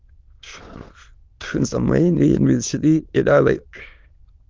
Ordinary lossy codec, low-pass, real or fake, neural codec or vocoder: Opus, 24 kbps; 7.2 kHz; fake; autoencoder, 22.05 kHz, a latent of 192 numbers a frame, VITS, trained on many speakers